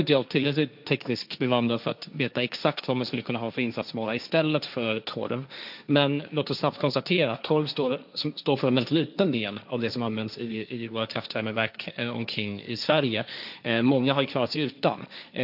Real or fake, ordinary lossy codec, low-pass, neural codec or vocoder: fake; none; 5.4 kHz; codec, 16 kHz, 1.1 kbps, Voila-Tokenizer